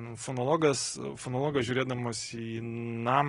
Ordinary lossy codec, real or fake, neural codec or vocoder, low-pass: AAC, 32 kbps; real; none; 10.8 kHz